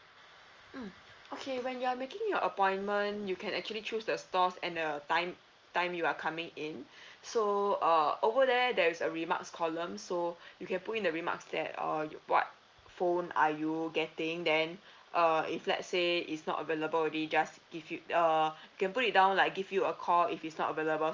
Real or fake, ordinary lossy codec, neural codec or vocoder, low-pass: real; Opus, 32 kbps; none; 7.2 kHz